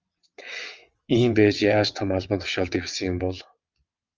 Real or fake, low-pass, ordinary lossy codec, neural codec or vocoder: fake; 7.2 kHz; Opus, 24 kbps; vocoder, 22.05 kHz, 80 mel bands, WaveNeXt